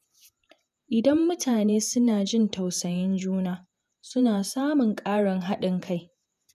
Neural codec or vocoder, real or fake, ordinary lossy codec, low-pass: vocoder, 48 kHz, 128 mel bands, Vocos; fake; none; 14.4 kHz